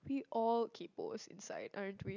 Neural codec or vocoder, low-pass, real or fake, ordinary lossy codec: none; 7.2 kHz; real; none